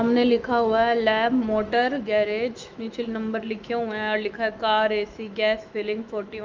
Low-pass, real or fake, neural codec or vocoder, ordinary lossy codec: 7.2 kHz; fake; autoencoder, 48 kHz, 128 numbers a frame, DAC-VAE, trained on Japanese speech; Opus, 24 kbps